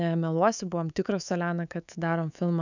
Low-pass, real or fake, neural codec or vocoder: 7.2 kHz; fake; codec, 24 kHz, 3.1 kbps, DualCodec